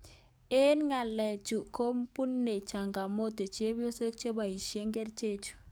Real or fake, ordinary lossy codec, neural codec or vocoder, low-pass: fake; none; codec, 44.1 kHz, 7.8 kbps, DAC; none